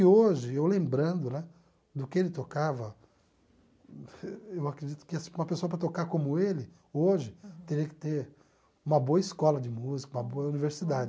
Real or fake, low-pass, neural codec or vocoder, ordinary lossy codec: real; none; none; none